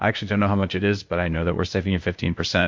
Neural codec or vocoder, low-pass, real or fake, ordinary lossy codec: codec, 16 kHz, 0.8 kbps, ZipCodec; 7.2 kHz; fake; MP3, 48 kbps